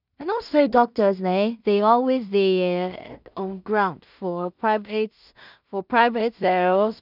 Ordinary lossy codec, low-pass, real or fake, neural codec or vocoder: none; 5.4 kHz; fake; codec, 16 kHz in and 24 kHz out, 0.4 kbps, LongCat-Audio-Codec, two codebook decoder